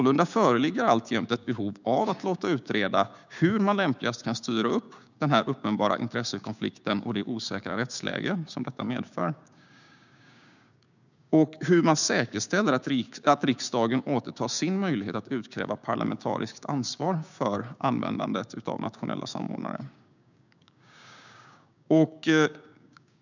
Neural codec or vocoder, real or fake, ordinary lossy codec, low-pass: codec, 16 kHz, 6 kbps, DAC; fake; none; 7.2 kHz